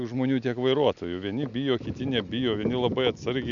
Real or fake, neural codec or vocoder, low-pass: real; none; 7.2 kHz